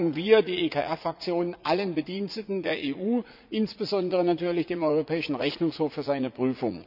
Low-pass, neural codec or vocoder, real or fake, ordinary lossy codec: 5.4 kHz; vocoder, 44.1 kHz, 80 mel bands, Vocos; fake; none